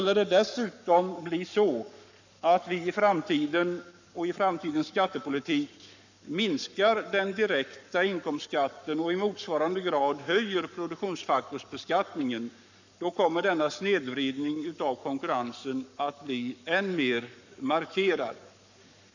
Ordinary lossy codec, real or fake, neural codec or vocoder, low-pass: none; fake; codec, 44.1 kHz, 7.8 kbps, Pupu-Codec; 7.2 kHz